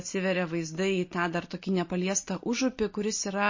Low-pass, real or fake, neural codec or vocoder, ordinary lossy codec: 7.2 kHz; real; none; MP3, 32 kbps